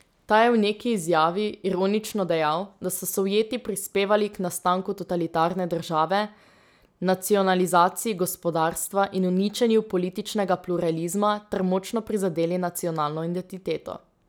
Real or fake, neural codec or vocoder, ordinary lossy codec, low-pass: real; none; none; none